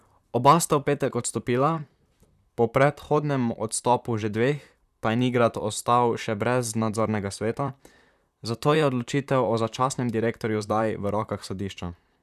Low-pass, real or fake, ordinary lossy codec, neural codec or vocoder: 14.4 kHz; fake; none; vocoder, 44.1 kHz, 128 mel bands, Pupu-Vocoder